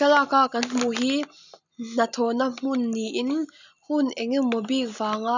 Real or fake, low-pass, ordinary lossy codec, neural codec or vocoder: real; 7.2 kHz; none; none